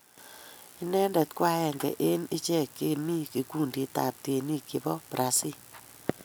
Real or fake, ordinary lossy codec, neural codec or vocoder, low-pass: real; none; none; none